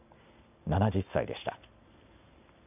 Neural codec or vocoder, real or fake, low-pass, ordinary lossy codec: none; real; 3.6 kHz; none